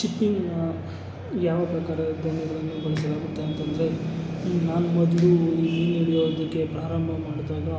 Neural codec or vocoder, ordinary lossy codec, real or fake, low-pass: none; none; real; none